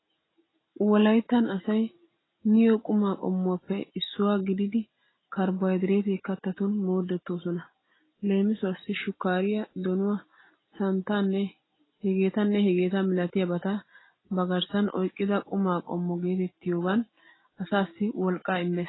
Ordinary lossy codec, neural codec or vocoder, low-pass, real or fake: AAC, 16 kbps; none; 7.2 kHz; real